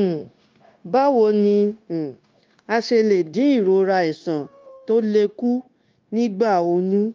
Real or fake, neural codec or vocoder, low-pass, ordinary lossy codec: fake; codec, 16 kHz, 0.9 kbps, LongCat-Audio-Codec; 7.2 kHz; Opus, 24 kbps